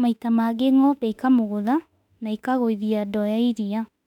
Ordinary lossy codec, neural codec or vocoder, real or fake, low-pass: none; autoencoder, 48 kHz, 32 numbers a frame, DAC-VAE, trained on Japanese speech; fake; 19.8 kHz